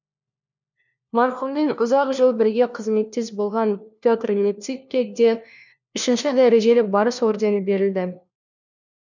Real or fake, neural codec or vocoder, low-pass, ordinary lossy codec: fake; codec, 16 kHz, 1 kbps, FunCodec, trained on LibriTTS, 50 frames a second; 7.2 kHz; none